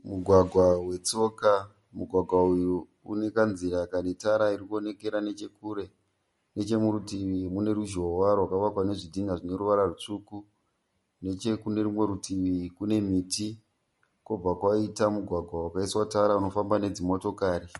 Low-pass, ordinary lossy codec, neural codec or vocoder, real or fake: 19.8 kHz; MP3, 48 kbps; none; real